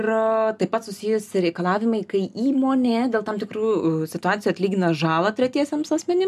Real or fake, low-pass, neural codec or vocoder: real; 14.4 kHz; none